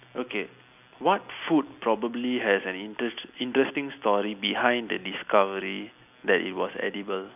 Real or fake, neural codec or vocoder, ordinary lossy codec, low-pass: real; none; none; 3.6 kHz